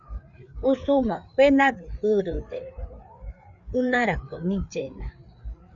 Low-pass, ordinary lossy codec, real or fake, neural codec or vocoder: 7.2 kHz; MP3, 96 kbps; fake; codec, 16 kHz, 4 kbps, FreqCodec, larger model